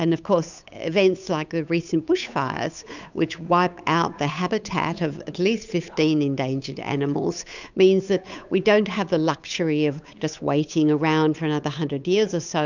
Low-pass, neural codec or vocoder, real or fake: 7.2 kHz; codec, 16 kHz, 8 kbps, FunCodec, trained on Chinese and English, 25 frames a second; fake